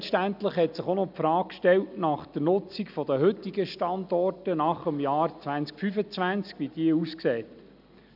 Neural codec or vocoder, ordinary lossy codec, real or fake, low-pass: none; none; real; 5.4 kHz